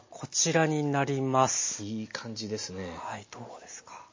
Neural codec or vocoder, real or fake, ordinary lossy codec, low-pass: none; real; MP3, 32 kbps; 7.2 kHz